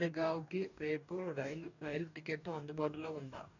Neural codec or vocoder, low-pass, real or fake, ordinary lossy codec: codec, 44.1 kHz, 2.6 kbps, DAC; 7.2 kHz; fake; none